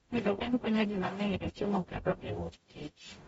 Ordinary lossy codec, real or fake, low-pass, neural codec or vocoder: AAC, 24 kbps; fake; 19.8 kHz; codec, 44.1 kHz, 0.9 kbps, DAC